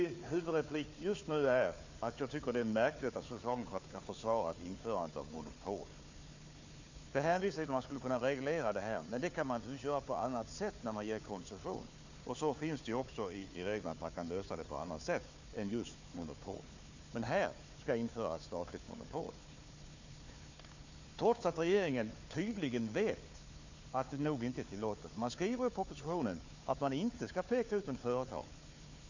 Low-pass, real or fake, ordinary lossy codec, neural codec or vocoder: 7.2 kHz; fake; Opus, 64 kbps; codec, 16 kHz, 4 kbps, FunCodec, trained on Chinese and English, 50 frames a second